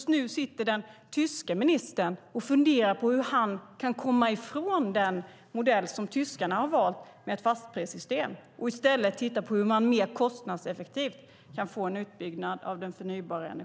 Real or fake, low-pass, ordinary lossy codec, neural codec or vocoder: real; none; none; none